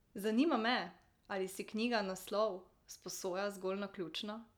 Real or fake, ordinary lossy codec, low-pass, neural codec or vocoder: real; none; 19.8 kHz; none